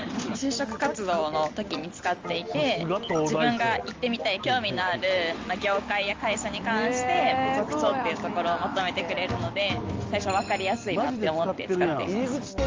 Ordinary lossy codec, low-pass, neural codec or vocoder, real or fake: Opus, 32 kbps; 7.2 kHz; none; real